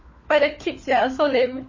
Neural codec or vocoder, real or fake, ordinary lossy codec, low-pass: codec, 16 kHz, 4 kbps, FunCodec, trained on LibriTTS, 50 frames a second; fake; MP3, 32 kbps; 7.2 kHz